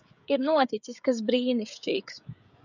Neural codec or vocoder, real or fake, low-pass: codec, 16 kHz, 16 kbps, FreqCodec, smaller model; fake; 7.2 kHz